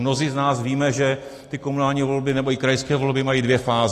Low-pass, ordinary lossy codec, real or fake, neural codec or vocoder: 14.4 kHz; AAC, 48 kbps; real; none